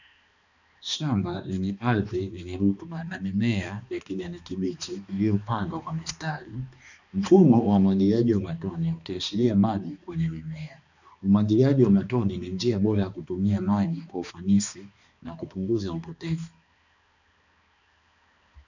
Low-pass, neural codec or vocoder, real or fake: 7.2 kHz; codec, 16 kHz, 2 kbps, X-Codec, HuBERT features, trained on balanced general audio; fake